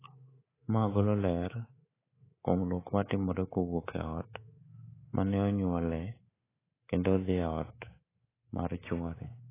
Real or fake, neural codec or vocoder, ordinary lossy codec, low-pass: fake; codec, 24 kHz, 3.1 kbps, DualCodec; AAC, 16 kbps; 3.6 kHz